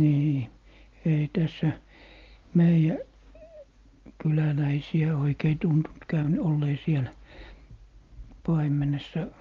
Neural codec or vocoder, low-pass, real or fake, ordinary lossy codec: none; 7.2 kHz; real; Opus, 32 kbps